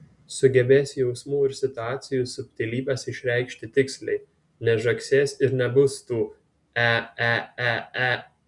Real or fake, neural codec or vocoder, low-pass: real; none; 10.8 kHz